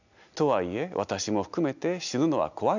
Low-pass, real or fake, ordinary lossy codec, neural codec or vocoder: 7.2 kHz; real; none; none